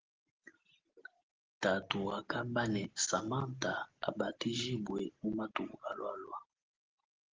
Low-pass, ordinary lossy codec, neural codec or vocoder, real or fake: 7.2 kHz; Opus, 16 kbps; none; real